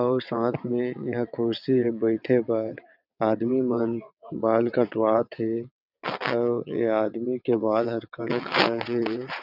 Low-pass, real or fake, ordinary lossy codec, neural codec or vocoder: 5.4 kHz; fake; none; vocoder, 22.05 kHz, 80 mel bands, WaveNeXt